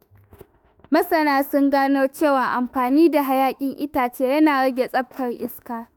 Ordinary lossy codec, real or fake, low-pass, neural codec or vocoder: none; fake; none; autoencoder, 48 kHz, 32 numbers a frame, DAC-VAE, trained on Japanese speech